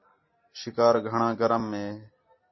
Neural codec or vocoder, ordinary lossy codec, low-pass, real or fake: none; MP3, 24 kbps; 7.2 kHz; real